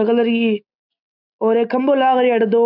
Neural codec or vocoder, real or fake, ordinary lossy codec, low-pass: none; real; none; 5.4 kHz